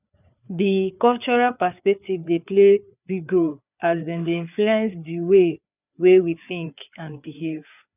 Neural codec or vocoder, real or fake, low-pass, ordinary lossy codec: codec, 16 kHz, 4 kbps, FreqCodec, larger model; fake; 3.6 kHz; none